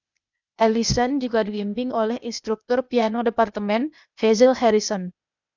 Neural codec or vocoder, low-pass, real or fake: codec, 16 kHz, 0.8 kbps, ZipCodec; 7.2 kHz; fake